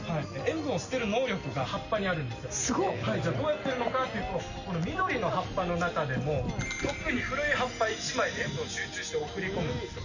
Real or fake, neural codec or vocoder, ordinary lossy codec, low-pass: real; none; none; 7.2 kHz